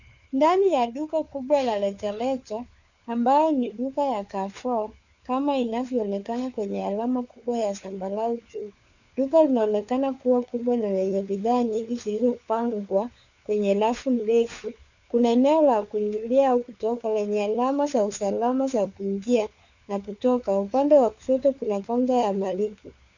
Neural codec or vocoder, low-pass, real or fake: codec, 16 kHz, 4 kbps, FunCodec, trained on LibriTTS, 50 frames a second; 7.2 kHz; fake